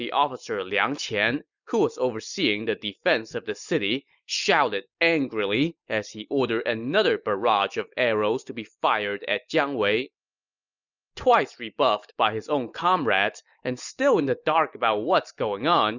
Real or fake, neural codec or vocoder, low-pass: real; none; 7.2 kHz